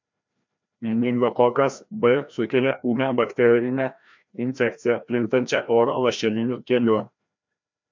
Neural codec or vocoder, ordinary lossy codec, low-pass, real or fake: codec, 16 kHz, 1 kbps, FreqCodec, larger model; MP3, 64 kbps; 7.2 kHz; fake